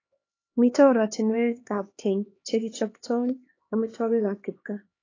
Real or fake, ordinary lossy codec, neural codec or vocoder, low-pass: fake; AAC, 32 kbps; codec, 16 kHz, 2 kbps, X-Codec, HuBERT features, trained on LibriSpeech; 7.2 kHz